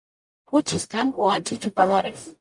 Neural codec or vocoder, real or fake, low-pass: codec, 44.1 kHz, 0.9 kbps, DAC; fake; 10.8 kHz